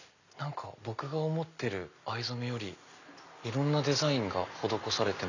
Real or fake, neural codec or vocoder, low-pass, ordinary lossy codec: real; none; 7.2 kHz; none